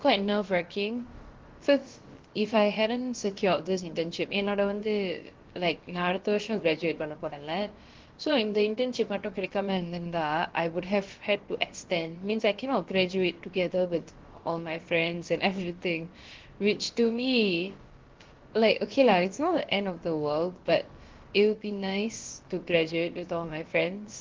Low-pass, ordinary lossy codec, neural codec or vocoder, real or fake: 7.2 kHz; Opus, 16 kbps; codec, 16 kHz, about 1 kbps, DyCAST, with the encoder's durations; fake